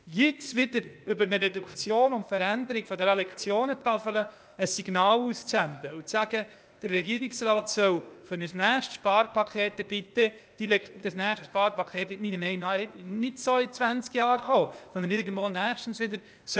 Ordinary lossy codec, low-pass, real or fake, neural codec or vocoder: none; none; fake; codec, 16 kHz, 0.8 kbps, ZipCodec